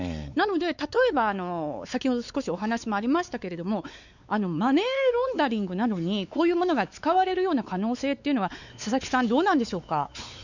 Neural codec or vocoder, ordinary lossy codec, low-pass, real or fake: codec, 16 kHz, 4 kbps, X-Codec, WavLM features, trained on Multilingual LibriSpeech; none; 7.2 kHz; fake